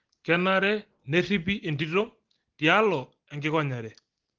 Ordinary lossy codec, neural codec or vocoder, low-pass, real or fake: Opus, 16 kbps; none; 7.2 kHz; real